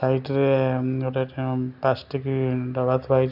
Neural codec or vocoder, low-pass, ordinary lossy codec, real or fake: none; 5.4 kHz; none; real